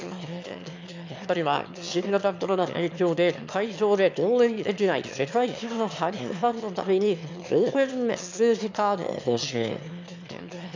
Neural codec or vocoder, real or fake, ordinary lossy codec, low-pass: autoencoder, 22.05 kHz, a latent of 192 numbers a frame, VITS, trained on one speaker; fake; MP3, 64 kbps; 7.2 kHz